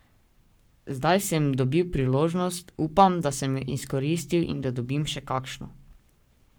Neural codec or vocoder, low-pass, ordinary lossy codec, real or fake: codec, 44.1 kHz, 7.8 kbps, Pupu-Codec; none; none; fake